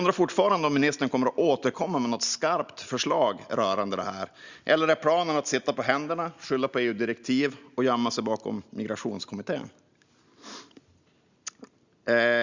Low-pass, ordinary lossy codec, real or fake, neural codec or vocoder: 7.2 kHz; Opus, 64 kbps; real; none